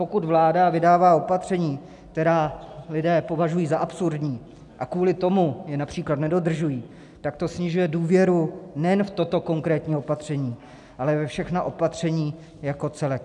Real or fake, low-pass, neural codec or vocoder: real; 10.8 kHz; none